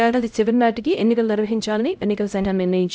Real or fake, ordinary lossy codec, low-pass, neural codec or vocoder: fake; none; none; codec, 16 kHz, 0.5 kbps, X-Codec, HuBERT features, trained on LibriSpeech